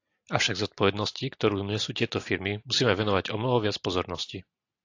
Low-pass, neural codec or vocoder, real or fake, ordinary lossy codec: 7.2 kHz; none; real; AAC, 48 kbps